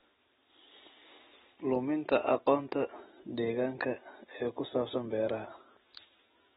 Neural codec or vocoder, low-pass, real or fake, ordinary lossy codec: none; 19.8 kHz; real; AAC, 16 kbps